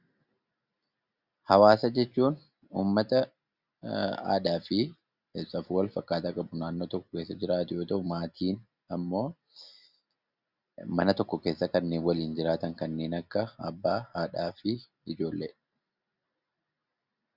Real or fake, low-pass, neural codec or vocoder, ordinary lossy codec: real; 5.4 kHz; none; Opus, 64 kbps